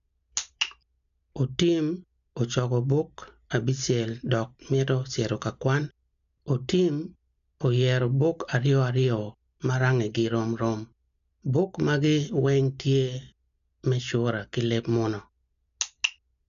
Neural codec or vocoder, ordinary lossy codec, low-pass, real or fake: none; none; 7.2 kHz; real